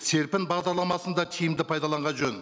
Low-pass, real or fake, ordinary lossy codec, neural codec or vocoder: none; real; none; none